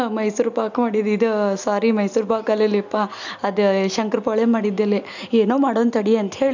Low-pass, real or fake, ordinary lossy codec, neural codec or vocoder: 7.2 kHz; real; none; none